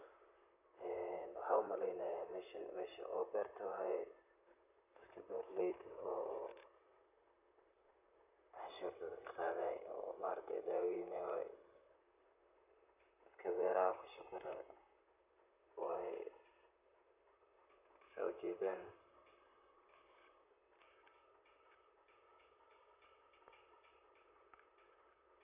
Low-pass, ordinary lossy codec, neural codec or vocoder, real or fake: 3.6 kHz; none; vocoder, 44.1 kHz, 128 mel bands, Pupu-Vocoder; fake